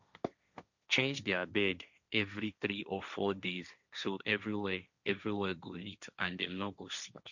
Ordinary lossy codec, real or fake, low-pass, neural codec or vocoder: none; fake; 7.2 kHz; codec, 16 kHz, 1.1 kbps, Voila-Tokenizer